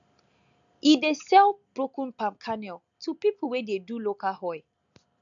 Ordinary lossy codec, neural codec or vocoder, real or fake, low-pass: MP3, 64 kbps; none; real; 7.2 kHz